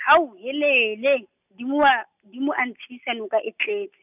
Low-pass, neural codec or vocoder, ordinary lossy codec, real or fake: 3.6 kHz; none; none; real